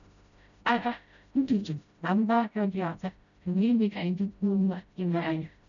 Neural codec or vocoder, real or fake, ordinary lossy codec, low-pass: codec, 16 kHz, 0.5 kbps, FreqCodec, smaller model; fake; none; 7.2 kHz